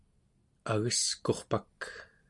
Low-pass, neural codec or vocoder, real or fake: 10.8 kHz; none; real